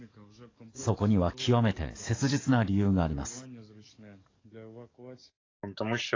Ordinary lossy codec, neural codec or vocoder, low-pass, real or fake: AAC, 32 kbps; codec, 44.1 kHz, 7.8 kbps, DAC; 7.2 kHz; fake